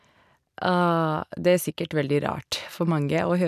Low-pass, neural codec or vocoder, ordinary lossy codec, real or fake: 14.4 kHz; none; none; real